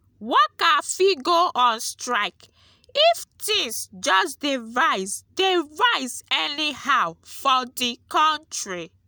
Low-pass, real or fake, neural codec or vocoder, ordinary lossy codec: none; real; none; none